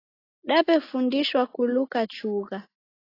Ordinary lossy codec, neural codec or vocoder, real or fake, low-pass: AAC, 24 kbps; none; real; 5.4 kHz